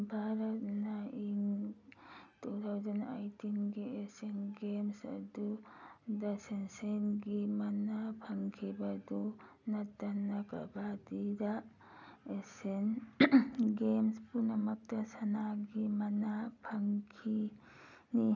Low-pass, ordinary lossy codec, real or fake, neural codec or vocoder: 7.2 kHz; none; real; none